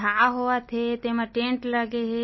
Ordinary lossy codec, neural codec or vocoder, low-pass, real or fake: MP3, 24 kbps; none; 7.2 kHz; real